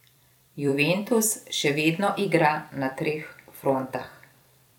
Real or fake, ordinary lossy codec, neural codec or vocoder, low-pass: fake; none; vocoder, 44.1 kHz, 128 mel bands every 256 samples, BigVGAN v2; 19.8 kHz